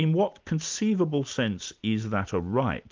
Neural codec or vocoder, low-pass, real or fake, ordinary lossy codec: none; 7.2 kHz; real; Opus, 24 kbps